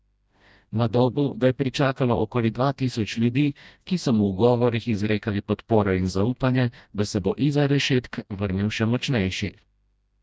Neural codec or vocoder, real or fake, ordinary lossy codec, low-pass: codec, 16 kHz, 1 kbps, FreqCodec, smaller model; fake; none; none